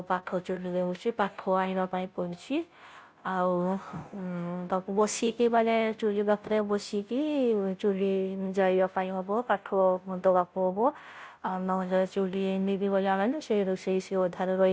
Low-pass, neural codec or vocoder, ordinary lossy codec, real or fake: none; codec, 16 kHz, 0.5 kbps, FunCodec, trained on Chinese and English, 25 frames a second; none; fake